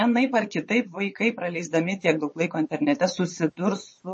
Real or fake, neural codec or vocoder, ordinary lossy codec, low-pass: fake; vocoder, 22.05 kHz, 80 mel bands, WaveNeXt; MP3, 32 kbps; 9.9 kHz